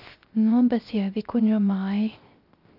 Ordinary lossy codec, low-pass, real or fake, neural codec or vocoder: Opus, 32 kbps; 5.4 kHz; fake; codec, 16 kHz, 0.3 kbps, FocalCodec